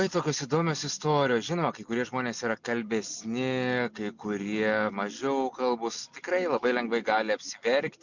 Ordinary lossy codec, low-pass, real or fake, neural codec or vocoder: MP3, 64 kbps; 7.2 kHz; real; none